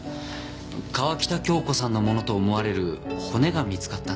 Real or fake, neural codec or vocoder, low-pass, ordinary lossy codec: real; none; none; none